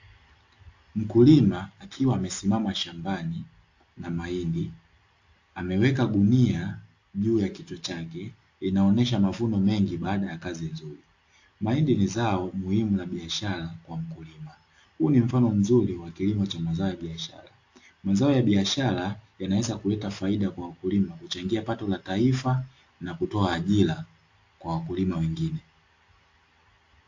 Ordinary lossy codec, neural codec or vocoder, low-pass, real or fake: AAC, 48 kbps; none; 7.2 kHz; real